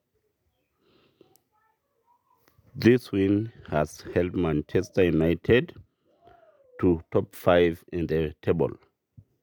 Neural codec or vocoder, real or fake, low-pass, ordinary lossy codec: none; real; 19.8 kHz; none